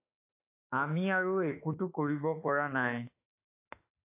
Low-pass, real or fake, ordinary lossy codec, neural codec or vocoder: 3.6 kHz; fake; AAC, 24 kbps; autoencoder, 48 kHz, 32 numbers a frame, DAC-VAE, trained on Japanese speech